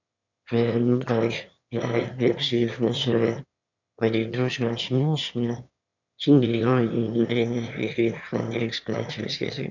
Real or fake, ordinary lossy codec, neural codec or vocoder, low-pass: fake; none; autoencoder, 22.05 kHz, a latent of 192 numbers a frame, VITS, trained on one speaker; 7.2 kHz